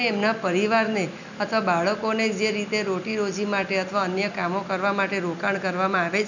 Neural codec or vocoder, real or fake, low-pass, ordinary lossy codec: none; real; 7.2 kHz; none